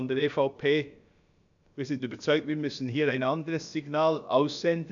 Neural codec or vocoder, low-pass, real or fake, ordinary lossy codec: codec, 16 kHz, about 1 kbps, DyCAST, with the encoder's durations; 7.2 kHz; fake; none